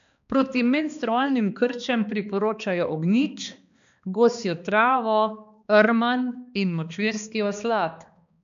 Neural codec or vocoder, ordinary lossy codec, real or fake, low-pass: codec, 16 kHz, 2 kbps, X-Codec, HuBERT features, trained on balanced general audio; MP3, 64 kbps; fake; 7.2 kHz